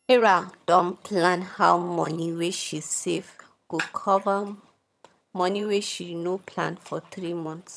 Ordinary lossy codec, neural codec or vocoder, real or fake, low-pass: none; vocoder, 22.05 kHz, 80 mel bands, HiFi-GAN; fake; none